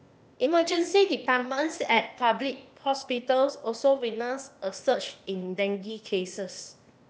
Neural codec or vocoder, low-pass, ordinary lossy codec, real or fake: codec, 16 kHz, 0.8 kbps, ZipCodec; none; none; fake